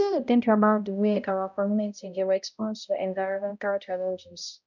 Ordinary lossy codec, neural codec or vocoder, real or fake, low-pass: none; codec, 16 kHz, 0.5 kbps, X-Codec, HuBERT features, trained on balanced general audio; fake; 7.2 kHz